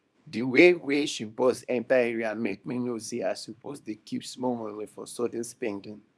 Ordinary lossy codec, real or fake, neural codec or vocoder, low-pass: none; fake; codec, 24 kHz, 0.9 kbps, WavTokenizer, small release; none